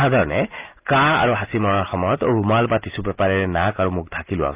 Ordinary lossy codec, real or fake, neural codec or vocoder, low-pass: Opus, 32 kbps; real; none; 3.6 kHz